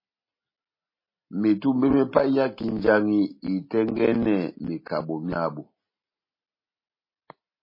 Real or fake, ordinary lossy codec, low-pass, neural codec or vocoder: real; MP3, 24 kbps; 5.4 kHz; none